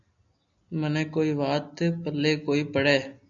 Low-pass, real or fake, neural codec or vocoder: 7.2 kHz; real; none